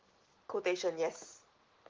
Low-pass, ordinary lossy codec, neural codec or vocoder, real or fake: 7.2 kHz; Opus, 16 kbps; none; real